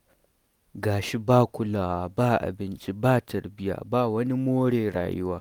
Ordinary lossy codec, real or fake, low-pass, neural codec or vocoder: none; real; none; none